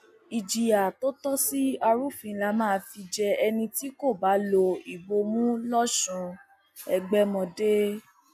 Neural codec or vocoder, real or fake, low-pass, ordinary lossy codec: none; real; 14.4 kHz; none